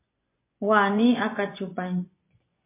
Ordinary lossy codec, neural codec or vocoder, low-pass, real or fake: MP3, 24 kbps; vocoder, 24 kHz, 100 mel bands, Vocos; 3.6 kHz; fake